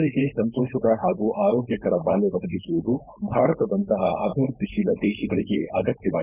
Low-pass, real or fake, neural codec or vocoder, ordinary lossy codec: 3.6 kHz; fake; codec, 16 kHz, 4.8 kbps, FACodec; none